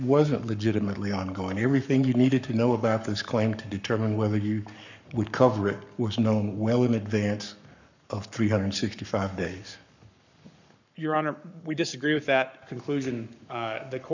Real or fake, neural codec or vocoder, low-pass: fake; codec, 44.1 kHz, 7.8 kbps, Pupu-Codec; 7.2 kHz